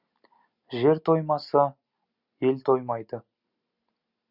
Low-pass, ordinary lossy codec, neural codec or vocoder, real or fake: 5.4 kHz; Opus, 64 kbps; none; real